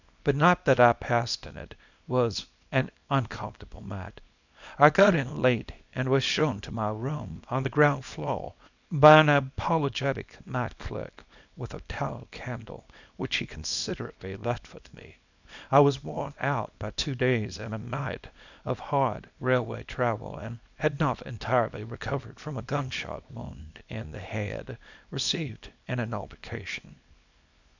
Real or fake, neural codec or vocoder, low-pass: fake; codec, 24 kHz, 0.9 kbps, WavTokenizer, small release; 7.2 kHz